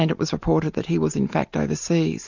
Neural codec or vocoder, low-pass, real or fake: none; 7.2 kHz; real